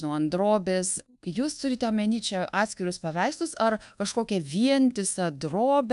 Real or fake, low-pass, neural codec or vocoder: fake; 10.8 kHz; codec, 24 kHz, 1.2 kbps, DualCodec